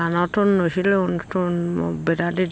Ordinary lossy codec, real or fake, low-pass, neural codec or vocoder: none; real; none; none